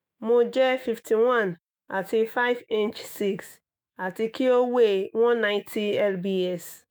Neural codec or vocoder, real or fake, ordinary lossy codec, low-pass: autoencoder, 48 kHz, 128 numbers a frame, DAC-VAE, trained on Japanese speech; fake; none; none